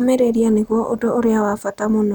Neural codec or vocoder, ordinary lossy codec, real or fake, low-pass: none; none; real; none